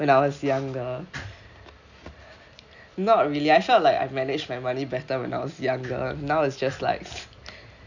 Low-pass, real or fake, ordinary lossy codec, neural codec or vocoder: 7.2 kHz; real; none; none